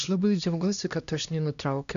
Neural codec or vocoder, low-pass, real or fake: codec, 16 kHz, 1 kbps, X-Codec, HuBERT features, trained on LibriSpeech; 7.2 kHz; fake